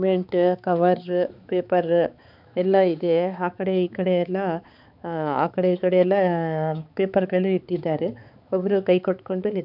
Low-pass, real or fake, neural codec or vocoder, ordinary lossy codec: 5.4 kHz; fake; codec, 16 kHz, 4 kbps, X-Codec, HuBERT features, trained on balanced general audio; none